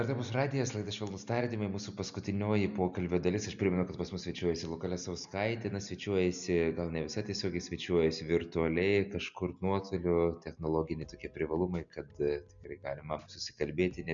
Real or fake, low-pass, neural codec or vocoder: real; 7.2 kHz; none